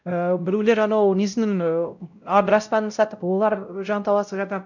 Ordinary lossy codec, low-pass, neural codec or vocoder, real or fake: none; 7.2 kHz; codec, 16 kHz, 0.5 kbps, X-Codec, WavLM features, trained on Multilingual LibriSpeech; fake